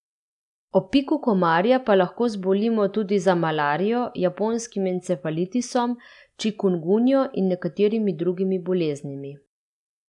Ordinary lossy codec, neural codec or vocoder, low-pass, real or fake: none; none; 10.8 kHz; real